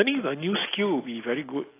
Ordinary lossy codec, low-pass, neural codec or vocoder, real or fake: AAC, 24 kbps; 3.6 kHz; none; real